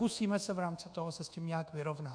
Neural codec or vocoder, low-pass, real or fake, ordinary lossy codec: codec, 24 kHz, 1.2 kbps, DualCodec; 9.9 kHz; fake; AAC, 64 kbps